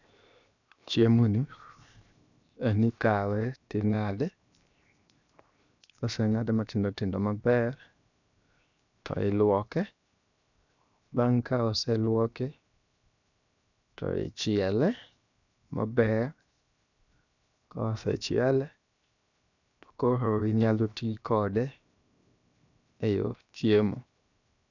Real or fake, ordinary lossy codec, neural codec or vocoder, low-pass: fake; none; codec, 16 kHz, 0.7 kbps, FocalCodec; 7.2 kHz